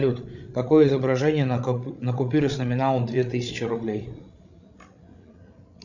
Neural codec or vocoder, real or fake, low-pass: codec, 16 kHz, 16 kbps, FreqCodec, larger model; fake; 7.2 kHz